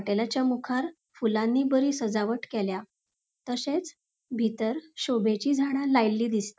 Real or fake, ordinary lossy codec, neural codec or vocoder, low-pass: real; none; none; none